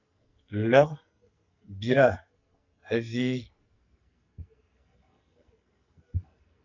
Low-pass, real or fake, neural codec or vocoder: 7.2 kHz; fake; codec, 44.1 kHz, 2.6 kbps, SNAC